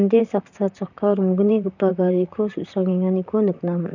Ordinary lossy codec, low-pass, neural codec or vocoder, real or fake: none; 7.2 kHz; vocoder, 44.1 kHz, 128 mel bands, Pupu-Vocoder; fake